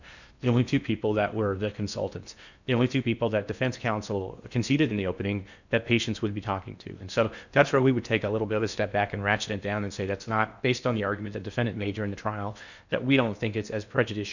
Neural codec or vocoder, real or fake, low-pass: codec, 16 kHz in and 24 kHz out, 0.8 kbps, FocalCodec, streaming, 65536 codes; fake; 7.2 kHz